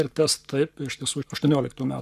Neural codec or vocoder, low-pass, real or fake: codec, 44.1 kHz, 7.8 kbps, Pupu-Codec; 14.4 kHz; fake